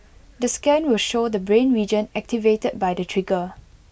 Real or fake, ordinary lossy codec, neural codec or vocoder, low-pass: real; none; none; none